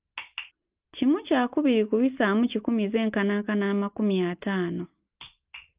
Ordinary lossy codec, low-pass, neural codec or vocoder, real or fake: Opus, 24 kbps; 3.6 kHz; none; real